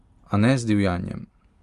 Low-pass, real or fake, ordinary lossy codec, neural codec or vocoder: 10.8 kHz; real; Opus, 32 kbps; none